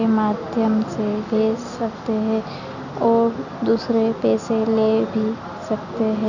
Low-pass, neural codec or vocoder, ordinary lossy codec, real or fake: 7.2 kHz; none; none; real